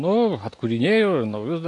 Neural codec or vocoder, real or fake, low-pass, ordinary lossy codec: none; real; 10.8 kHz; AAC, 48 kbps